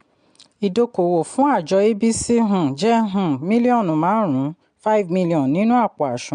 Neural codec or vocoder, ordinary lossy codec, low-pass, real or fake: none; MP3, 64 kbps; 9.9 kHz; real